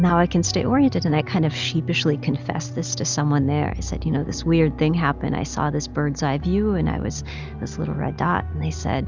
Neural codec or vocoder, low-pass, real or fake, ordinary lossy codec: none; 7.2 kHz; real; Opus, 64 kbps